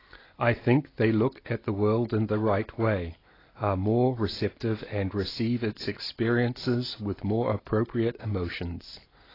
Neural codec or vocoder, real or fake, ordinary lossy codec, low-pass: none; real; AAC, 24 kbps; 5.4 kHz